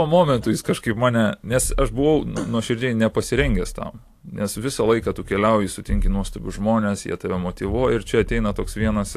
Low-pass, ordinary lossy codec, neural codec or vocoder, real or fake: 14.4 kHz; AAC, 64 kbps; none; real